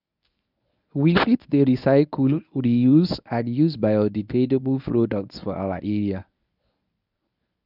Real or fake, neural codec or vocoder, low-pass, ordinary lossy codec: fake; codec, 24 kHz, 0.9 kbps, WavTokenizer, medium speech release version 1; 5.4 kHz; none